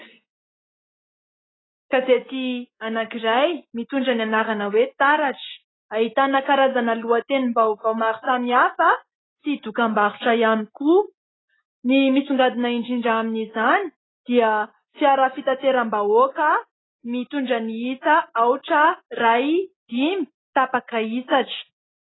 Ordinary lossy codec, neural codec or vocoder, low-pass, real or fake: AAC, 16 kbps; none; 7.2 kHz; real